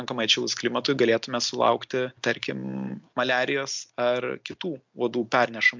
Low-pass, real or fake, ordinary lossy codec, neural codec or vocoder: 7.2 kHz; real; MP3, 64 kbps; none